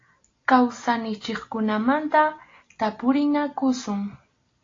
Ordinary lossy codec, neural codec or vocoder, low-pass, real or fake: AAC, 32 kbps; none; 7.2 kHz; real